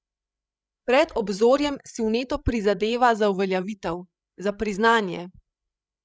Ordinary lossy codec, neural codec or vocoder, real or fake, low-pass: none; codec, 16 kHz, 16 kbps, FreqCodec, larger model; fake; none